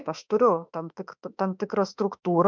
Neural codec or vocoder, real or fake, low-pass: autoencoder, 48 kHz, 32 numbers a frame, DAC-VAE, trained on Japanese speech; fake; 7.2 kHz